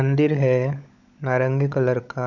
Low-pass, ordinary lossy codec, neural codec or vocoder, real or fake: 7.2 kHz; none; codec, 16 kHz, 16 kbps, FunCodec, trained on LibriTTS, 50 frames a second; fake